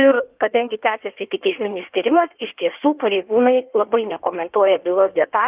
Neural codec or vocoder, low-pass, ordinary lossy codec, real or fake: codec, 16 kHz in and 24 kHz out, 1.1 kbps, FireRedTTS-2 codec; 3.6 kHz; Opus, 32 kbps; fake